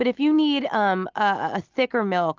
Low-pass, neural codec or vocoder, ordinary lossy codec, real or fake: 7.2 kHz; none; Opus, 24 kbps; real